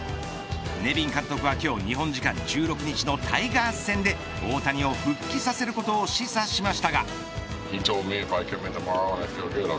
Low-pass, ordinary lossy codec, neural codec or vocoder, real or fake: none; none; none; real